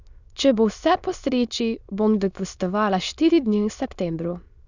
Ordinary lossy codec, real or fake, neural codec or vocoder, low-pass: none; fake; autoencoder, 22.05 kHz, a latent of 192 numbers a frame, VITS, trained on many speakers; 7.2 kHz